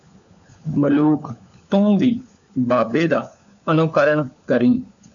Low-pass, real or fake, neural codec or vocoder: 7.2 kHz; fake; codec, 16 kHz, 4 kbps, FunCodec, trained on LibriTTS, 50 frames a second